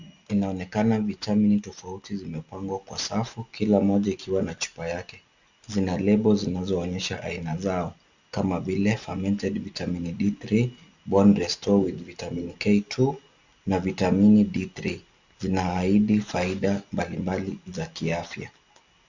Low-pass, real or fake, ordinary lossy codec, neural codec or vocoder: 7.2 kHz; real; Opus, 64 kbps; none